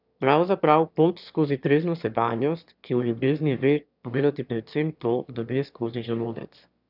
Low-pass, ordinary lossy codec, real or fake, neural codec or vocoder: 5.4 kHz; none; fake; autoencoder, 22.05 kHz, a latent of 192 numbers a frame, VITS, trained on one speaker